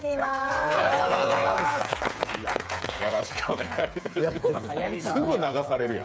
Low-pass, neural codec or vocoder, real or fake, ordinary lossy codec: none; codec, 16 kHz, 8 kbps, FreqCodec, smaller model; fake; none